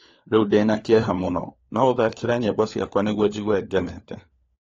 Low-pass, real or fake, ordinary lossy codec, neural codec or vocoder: 7.2 kHz; fake; AAC, 32 kbps; codec, 16 kHz, 4 kbps, FunCodec, trained on LibriTTS, 50 frames a second